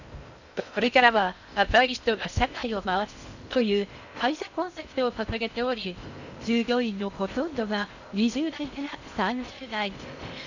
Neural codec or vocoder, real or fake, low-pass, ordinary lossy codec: codec, 16 kHz in and 24 kHz out, 0.6 kbps, FocalCodec, streaming, 2048 codes; fake; 7.2 kHz; none